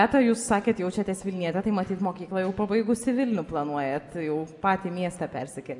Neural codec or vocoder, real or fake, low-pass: none; real; 10.8 kHz